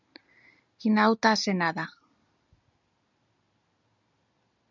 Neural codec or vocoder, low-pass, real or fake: none; 7.2 kHz; real